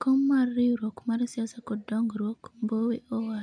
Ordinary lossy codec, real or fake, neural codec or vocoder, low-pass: none; real; none; 9.9 kHz